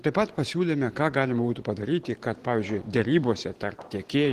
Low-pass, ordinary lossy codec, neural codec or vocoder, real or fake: 14.4 kHz; Opus, 16 kbps; vocoder, 44.1 kHz, 128 mel bands every 512 samples, BigVGAN v2; fake